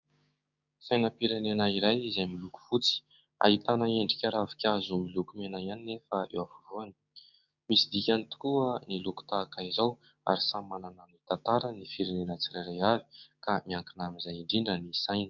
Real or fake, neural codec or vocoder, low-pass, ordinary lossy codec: fake; codec, 16 kHz, 6 kbps, DAC; 7.2 kHz; Opus, 64 kbps